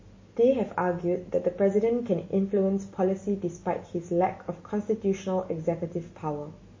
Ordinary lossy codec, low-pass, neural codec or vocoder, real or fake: MP3, 32 kbps; 7.2 kHz; none; real